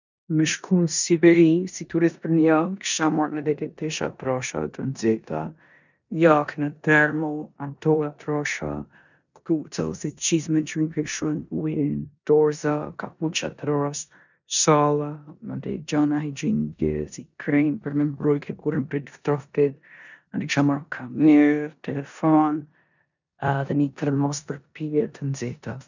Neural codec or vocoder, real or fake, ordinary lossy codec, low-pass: codec, 16 kHz in and 24 kHz out, 0.9 kbps, LongCat-Audio-Codec, four codebook decoder; fake; none; 7.2 kHz